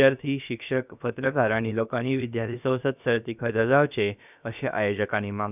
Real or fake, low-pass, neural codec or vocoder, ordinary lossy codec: fake; 3.6 kHz; codec, 16 kHz, about 1 kbps, DyCAST, with the encoder's durations; none